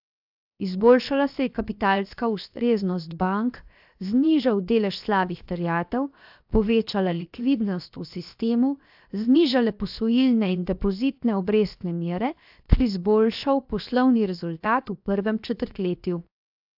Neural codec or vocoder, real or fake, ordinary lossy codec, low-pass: codec, 16 kHz, 0.7 kbps, FocalCodec; fake; AAC, 48 kbps; 5.4 kHz